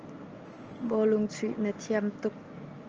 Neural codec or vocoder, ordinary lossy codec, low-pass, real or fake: none; Opus, 24 kbps; 7.2 kHz; real